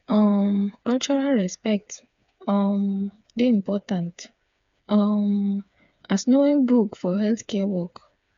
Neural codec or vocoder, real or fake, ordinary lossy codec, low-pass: codec, 16 kHz, 4 kbps, FreqCodec, smaller model; fake; MP3, 64 kbps; 7.2 kHz